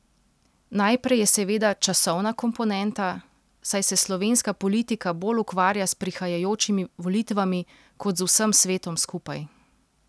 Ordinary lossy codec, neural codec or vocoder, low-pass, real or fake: none; none; none; real